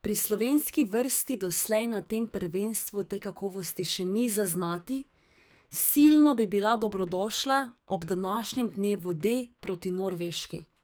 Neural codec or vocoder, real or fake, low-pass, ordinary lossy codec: codec, 44.1 kHz, 2.6 kbps, SNAC; fake; none; none